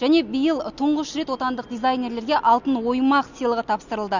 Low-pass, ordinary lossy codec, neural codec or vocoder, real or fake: 7.2 kHz; none; none; real